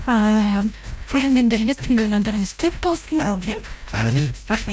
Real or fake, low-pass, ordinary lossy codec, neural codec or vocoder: fake; none; none; codec, 16 kHz, 0.5 kbps, FreqCodec, larger model